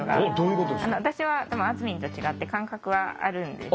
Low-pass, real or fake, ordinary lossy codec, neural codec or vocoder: none; real; none; none